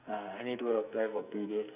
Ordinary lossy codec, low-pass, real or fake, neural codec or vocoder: none; 3.6 kHz; fake; codec, 44.1 kHz, 2.6 kbps, SNAC